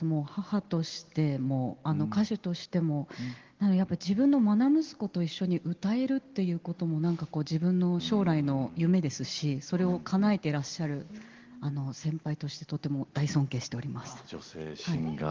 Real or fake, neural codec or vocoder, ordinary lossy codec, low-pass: real; none; Opus, 16 kbps; 7.2 kHz